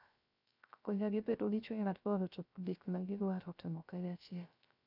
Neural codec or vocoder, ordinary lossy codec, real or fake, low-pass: codec, 16 kHz, 0.3 kbps, FocalCodec; none; fake; 5.4 kHz